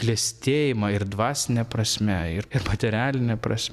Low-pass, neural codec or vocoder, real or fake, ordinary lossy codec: 14.4 kHz; none; real; Opus, 64 kbps